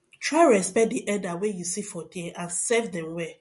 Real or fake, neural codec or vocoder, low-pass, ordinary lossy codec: real; none; 14.4 kHz; MP3, 48 kbps